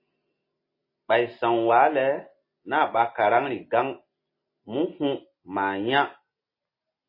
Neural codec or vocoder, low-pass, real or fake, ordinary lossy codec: none; 5.4 kHz; real; MP3, 24 kbps